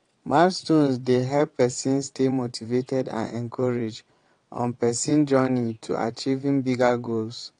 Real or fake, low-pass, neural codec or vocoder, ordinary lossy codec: fake; 9.9 kHz; vocoder, 22.05 kHz, 80 mel bands, WaveNeXt; AAC, 48 kbps